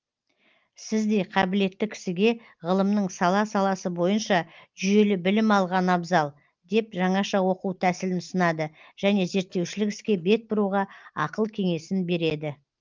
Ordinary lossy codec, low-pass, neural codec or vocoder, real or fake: Opus, 32 kbps; 7.2 kHz; none; real